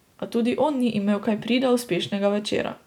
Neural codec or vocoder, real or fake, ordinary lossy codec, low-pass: none; real; none; 19.8 kHz